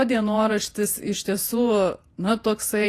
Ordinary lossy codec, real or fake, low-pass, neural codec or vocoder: AAC, 48 kbps; fake; 14.4 kHz; vocoder, 48 kHz, 128 mel bands, Vocos